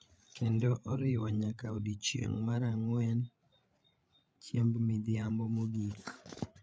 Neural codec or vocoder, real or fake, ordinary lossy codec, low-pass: codec, 16 kHz, 8 kbps, FreqCodec, larger model; fake; none; none